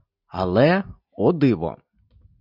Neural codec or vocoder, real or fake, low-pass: none; real; 5.4 kHz